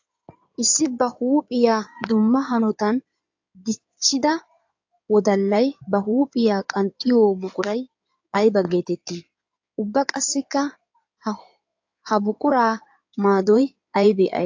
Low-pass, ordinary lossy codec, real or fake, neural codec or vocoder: 7.2 kHz; AAC, 48 kbps; fake; codec, 16 kHz in and 24 kHz out, 2.2 kbps, FireRedTTS-2 codec